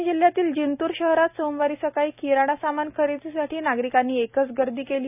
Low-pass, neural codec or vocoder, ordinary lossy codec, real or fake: 3.6 kHz; none; none; real